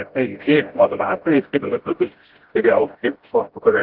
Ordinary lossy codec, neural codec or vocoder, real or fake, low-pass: Opus, 16 kbps; codec, 16 kHz, 0.5 kbps, FreqCodec, smaller model; fake; 5.4 kHz